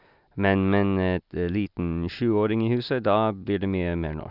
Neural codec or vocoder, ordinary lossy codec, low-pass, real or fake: none; Opus, 64 kbps; 5.4 kHz; real